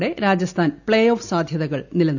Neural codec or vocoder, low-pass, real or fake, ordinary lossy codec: none; 7.2 kHz; real; none